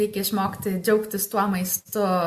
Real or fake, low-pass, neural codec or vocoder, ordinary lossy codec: real; 14.4 kHz; none; MP3, 64 kbps